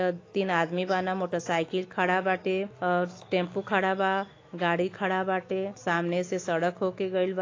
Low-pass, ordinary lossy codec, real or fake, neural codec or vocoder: 7.2 kHz; AAC, 32 kbps; fake; autoencoder, 48 kHz, 128 numbers a frame, DAC-VAE, trained on Japanese speech